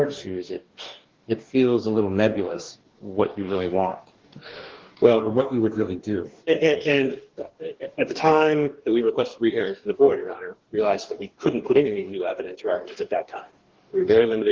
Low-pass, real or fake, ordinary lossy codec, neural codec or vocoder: 7.2 kHz; fake; Opus, 16 kbps; codec, 44.1 kHz, 2.6 kbps, DAC